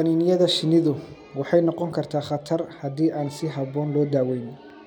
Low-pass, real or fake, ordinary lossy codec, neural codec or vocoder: 19.8 kHz; real; none; none